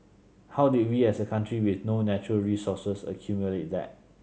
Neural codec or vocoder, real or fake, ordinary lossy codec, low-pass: none; real; none; none